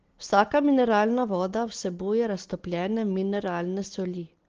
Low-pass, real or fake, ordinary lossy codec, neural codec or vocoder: 7.2 kHz; real; Opus, 16 kbps; none